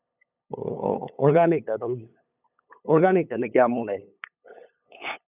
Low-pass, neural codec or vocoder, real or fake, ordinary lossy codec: 3.6 kHz; codec, 16 kHz, 8 kbps, FunCodec, trained on LibriTTS, 25 frames a second; fake; none